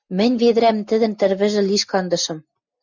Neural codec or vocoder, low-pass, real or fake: none; 7.2 kHz; real